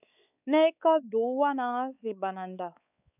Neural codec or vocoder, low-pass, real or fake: codec, 16 kHz, 4 kbps, X-Codec, WavLM features, trained on Multilingual LibriSpeech; 3.6 kHz; fake